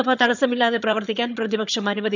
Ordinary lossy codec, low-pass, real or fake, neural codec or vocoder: none; 7.2 kHz; fake; vocoder, 22.05 kHz, 80 mel bands, HiFi-GAN